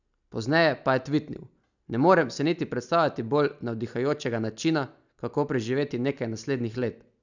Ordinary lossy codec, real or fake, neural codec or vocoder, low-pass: none; real; none; 7.2 kHz